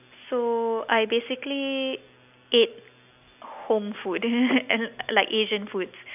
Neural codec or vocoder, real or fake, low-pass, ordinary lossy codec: none; real; 3.6 kHz; none